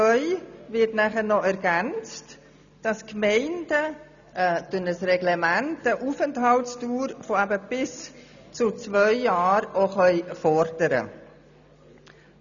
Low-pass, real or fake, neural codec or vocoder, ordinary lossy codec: 7.2 kHz; real; none; none